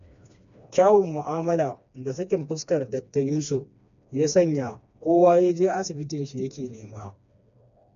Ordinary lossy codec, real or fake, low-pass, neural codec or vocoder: none; fake; 7.2 kHz; codec, 16 kHz, 2 kbps, FreqCodec, smaller model